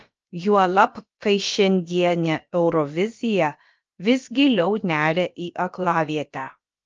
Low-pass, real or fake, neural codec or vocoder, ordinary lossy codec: 7.2 kHz; fake; codec, 16 kHz, about 1 kbps, DyCAST, with the encoder's durations; Opus, 24 kbps